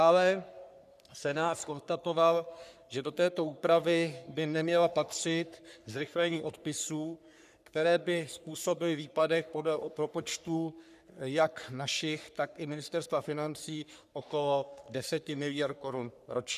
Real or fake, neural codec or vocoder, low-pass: fake; codec, 44.1 kHz, 3.4 kbps, Pupu-Codec; 14.4 kHz